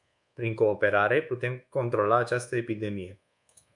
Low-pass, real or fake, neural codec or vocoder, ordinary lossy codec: 10.8 kHz; fake; codec, 24 kHz, 1.2 kbps, DualCodec; MP3, 96 kbps